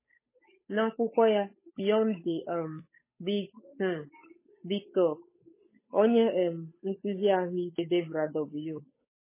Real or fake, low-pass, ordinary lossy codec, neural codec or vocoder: fake; 3.6 kHz; MP3, 16 kbps; codec, 16 kHz, 8 kbps, FunCodec, trained on Chinese and English, 25 frames a second